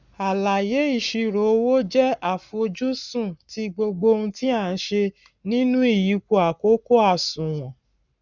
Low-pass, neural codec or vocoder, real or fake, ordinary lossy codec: 7.2 kHz; codec, 44.1 kHz, 7.8 kbps, Pupu-Codec; fake; none